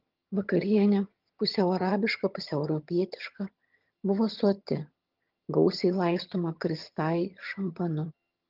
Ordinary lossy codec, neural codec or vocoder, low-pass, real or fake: Opus, 24 kbps; vocoder, 22.05 kHz, 80 mel bands, HiFi-GAN; 5.4 kHz; fake